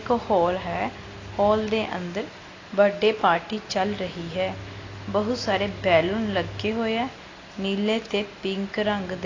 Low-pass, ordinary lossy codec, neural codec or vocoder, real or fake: 7.2 kHz; AAC, 32 kbps; none; real